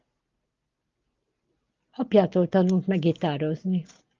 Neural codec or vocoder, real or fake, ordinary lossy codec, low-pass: none; real; Opus, 32 kbps; 7.2 kHz